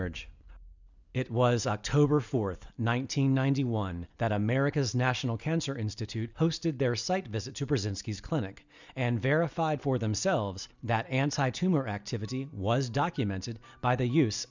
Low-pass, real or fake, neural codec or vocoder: 7.2 kHz; real; none